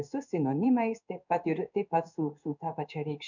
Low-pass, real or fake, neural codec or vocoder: 7.2 kHz; fake; codec, 16 kHz in and 24 kHz out, 1 kbps, XY-Tokenizer